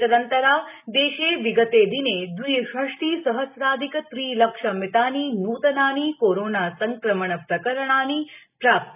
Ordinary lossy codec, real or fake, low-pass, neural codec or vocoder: none; real; 3.6 kHz; none